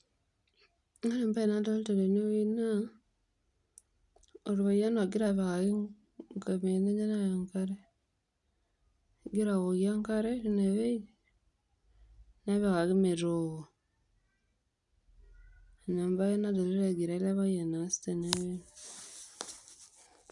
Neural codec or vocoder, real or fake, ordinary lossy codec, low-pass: none; real; none; 10.8 kHz